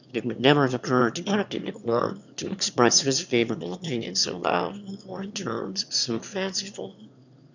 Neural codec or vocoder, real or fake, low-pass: autoencoder, 22.05 kHz, a latent of 192 numbers a frame, VITS, trained on one speaker; fake; 7.2 kHz